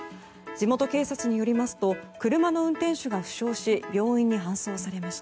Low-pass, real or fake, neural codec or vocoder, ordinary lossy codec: none; real; none; none